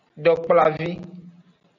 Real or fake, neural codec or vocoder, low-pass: real; none; 7.2 kHz